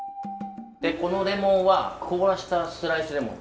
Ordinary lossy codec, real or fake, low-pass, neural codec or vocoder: none; real; none; none